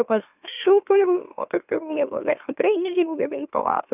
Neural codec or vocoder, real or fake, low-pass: autoencoder, 44.1 kHz, a latent of 192 numbers a frame, MeloTTS; fake; 3.6 kHz